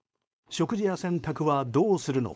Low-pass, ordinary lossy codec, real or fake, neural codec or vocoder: none; none; fake; codec, 16 kHz, 4.8 kbps, FACodec